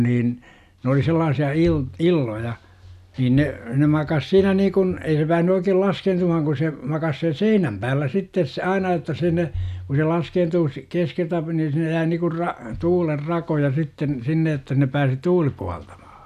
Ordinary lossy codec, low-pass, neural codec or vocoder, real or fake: none; 14.4 kHz; none; real